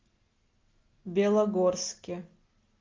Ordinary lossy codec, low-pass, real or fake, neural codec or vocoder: Opus, 32 kbps; 7.2 kHz; real; none